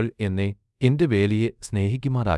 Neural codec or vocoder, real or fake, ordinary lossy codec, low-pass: codec, 24 kHz, 0.5 kbps, DualCodec; fake; none; none